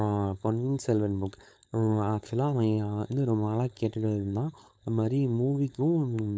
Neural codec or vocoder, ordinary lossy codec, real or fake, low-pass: codec, 16 kHz, 4.8 kbps, FACodec; none; fake; none